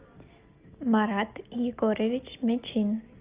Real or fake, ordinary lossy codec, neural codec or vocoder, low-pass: real; Opus, 32 kbps; none; 3.6 kHz